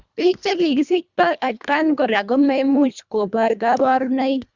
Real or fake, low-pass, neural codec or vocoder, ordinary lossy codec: fake; 7.2 kHz; codec, 24 kHz, 1.5 kbps, HILCodec; Opus, 64 kbps